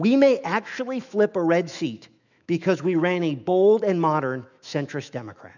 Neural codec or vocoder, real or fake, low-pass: autoencoder, 48 kHz, 128 numbers a frame, DAC-VAE, trained on Japanese speech; fake; 7.2 kHz